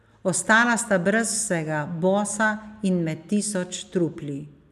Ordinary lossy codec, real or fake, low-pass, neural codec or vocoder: none; real; 14.4 kHz; none